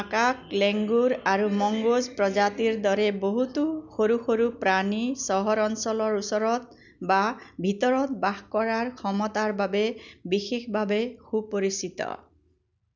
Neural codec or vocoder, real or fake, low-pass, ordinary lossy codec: none; real; 7.2 kHz; none